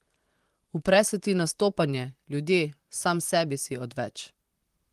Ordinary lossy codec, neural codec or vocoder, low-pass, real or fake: Opus, 24 kbps; vocoder, 44.1 kHz, 128 mel bands, Pupu-Vocoder; 14.4 kHz; fake